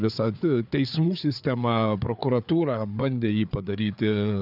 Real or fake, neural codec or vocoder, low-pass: fake; codec, 16 kHz, 4 kbps, FreqCodec, larger model; 5.4 kHz